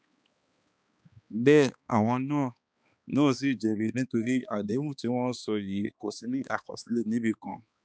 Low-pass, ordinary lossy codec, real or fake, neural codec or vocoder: none; none; fake; codec, 16 kHz, 2 kbps, X-Codec, HuBERT features, trained on balanced general audio